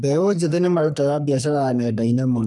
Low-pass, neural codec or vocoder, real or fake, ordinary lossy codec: 10.8 kHz; codec, 44.1 kHz, 2.6 kbps, SNAC; fake; none